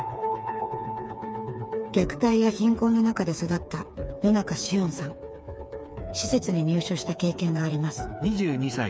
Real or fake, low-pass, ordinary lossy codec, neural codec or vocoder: fake; none; none; codec, 16 kHz, 4 kbps, FreqCodec, smaller model